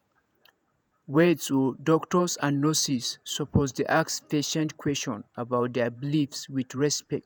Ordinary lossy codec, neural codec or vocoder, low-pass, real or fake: none; vocoder, 48 kHz, 128 mel bands, Vocos; none; fake